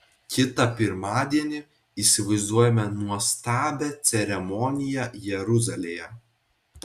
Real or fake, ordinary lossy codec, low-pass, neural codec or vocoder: real; Opus, 64 kbps; 14.4 kHz; none